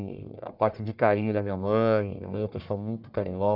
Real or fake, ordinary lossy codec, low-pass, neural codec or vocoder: fake; none; 5.4 kHz; codec, 44.1 kHz, 1.7 kbps, Pupu-Codec